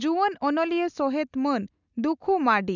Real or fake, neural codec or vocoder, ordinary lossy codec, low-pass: real; none; none; 7.2 kHz